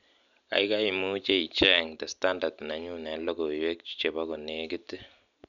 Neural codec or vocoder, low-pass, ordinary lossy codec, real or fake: none; 7.2 kHz; none; real